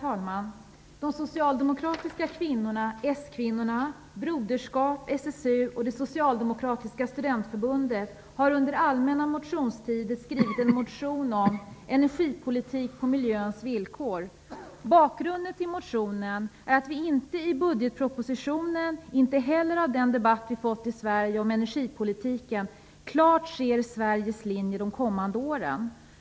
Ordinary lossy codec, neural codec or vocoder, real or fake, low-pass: none; none; real; none